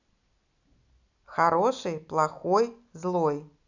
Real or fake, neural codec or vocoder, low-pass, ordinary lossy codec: real; none; 7.2 kHz; none